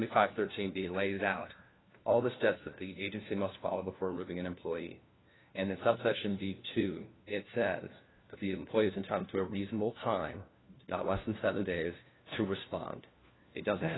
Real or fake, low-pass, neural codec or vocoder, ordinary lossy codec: fake; 7.2 kHz; codec, 16 kHz, 1 kbps, FunCodec, trained on LibriTTS, 50 frames a second; AAC, 16 kbps